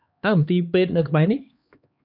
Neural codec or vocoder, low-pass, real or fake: codec, 16 kHz, 2 kbps, X-Codec, HuBERT features, trained on LibriSpeech; 5.4 kHz; fake